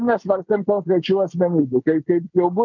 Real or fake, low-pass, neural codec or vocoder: real; 7.2 kHz; none